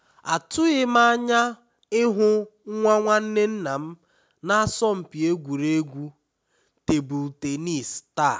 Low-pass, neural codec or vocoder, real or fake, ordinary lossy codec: none; none; real; none